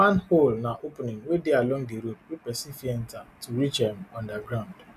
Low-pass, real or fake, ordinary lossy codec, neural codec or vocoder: 14.4 kHz; real; none; none